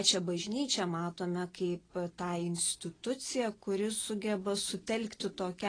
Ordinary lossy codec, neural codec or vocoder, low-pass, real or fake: AAC, 32 kbps; none; 9.9 kHz; real